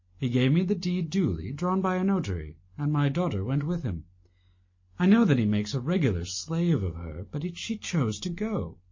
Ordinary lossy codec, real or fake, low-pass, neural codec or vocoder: MP3, 32 kbps; real; 7.2 kHz; none